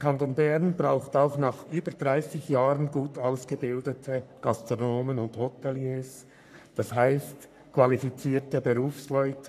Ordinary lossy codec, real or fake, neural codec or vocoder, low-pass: none; fake; codec, 44.1 kHz, 3.4 kbps, Pupu-Codec; 14.4 kHz